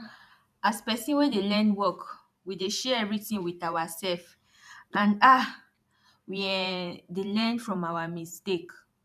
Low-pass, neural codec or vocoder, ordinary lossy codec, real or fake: 14.4 kHz; vocoder, 44.1 kHz, 128 mel bands every 512 samples, BigVGAN v2; none; fake